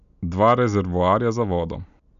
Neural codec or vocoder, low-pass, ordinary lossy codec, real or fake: none; 7.2 kHz; none; real